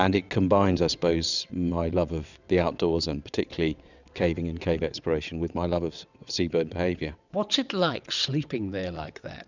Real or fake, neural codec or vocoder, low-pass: fake; vocoder, 22.05 kHz, 80 mel bands, WaveNeXt; 7.2 kHz